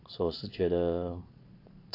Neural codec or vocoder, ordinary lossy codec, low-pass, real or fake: vocoder, 22.05 kHz, 80 mel bands, Vocos; AAC, 32 kbps; 5.4 kHz; fake